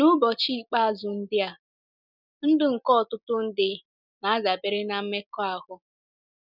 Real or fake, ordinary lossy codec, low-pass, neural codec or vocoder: real; none; 5.4 kHz; none